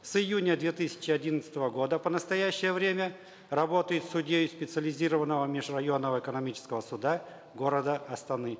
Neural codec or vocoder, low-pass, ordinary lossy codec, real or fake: none; none; none; real